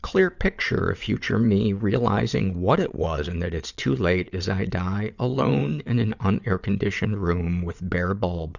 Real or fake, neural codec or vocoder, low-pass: fake; vocoder, 22.05 kHz, 80 mel bands, WaveNeXt; 7.2 kHz